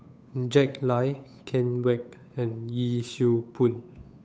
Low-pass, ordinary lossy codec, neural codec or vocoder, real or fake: none; none; codec, 16 kHz, 8 kbps, FunCodec, trained on Chinese and English, 25 frames a second; fake